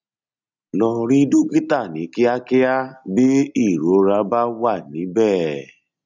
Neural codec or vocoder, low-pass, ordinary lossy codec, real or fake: none; 7.2 kHz; none; real